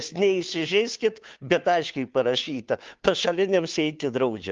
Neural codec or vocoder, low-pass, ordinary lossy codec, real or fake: codec, 16 kHz, 2 kbps, FunCodec, trained on Chinese and English, 25 frames a second; 7.2 kHz; Opus, 32 kbps; fake